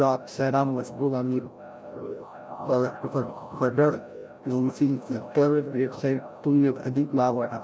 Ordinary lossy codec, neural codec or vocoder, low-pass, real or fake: none; codec, 16 kHz, 0.5 kbps, FreqCodec, larger model; none; fake